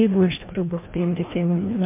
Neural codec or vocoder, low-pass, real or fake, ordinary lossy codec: codec, 16 kHz, 1 kbps, FreqCodec, larger model; 3.6 kHz; fake; MP3, 24 kbps